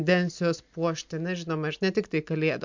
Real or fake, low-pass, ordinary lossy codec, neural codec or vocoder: real; 7.2 kHz; MP3, 64 kbps; none